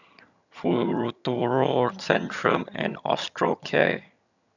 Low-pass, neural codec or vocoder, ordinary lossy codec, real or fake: 7.2 kHz; vocoder, 22.05 kHz, 80 mel bands, HiFi-GAN; none; fake